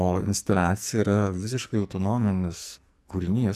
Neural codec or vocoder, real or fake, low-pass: codec, 44.1 kHz, 2.6 kbps, SNAC; fake; 14.4 kHz